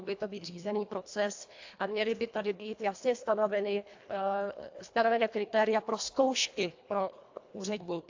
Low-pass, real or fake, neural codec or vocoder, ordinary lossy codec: 7.2 kHz; fake; codec, 24 kHz, 1.5 kbps, HILCodec; AAC, 48 kbps